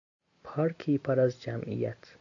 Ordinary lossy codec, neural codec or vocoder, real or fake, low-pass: AAC, 64 kbps; none; real; 7.2 kHz